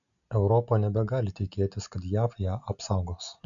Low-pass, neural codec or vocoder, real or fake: 7.2 kHz; none; real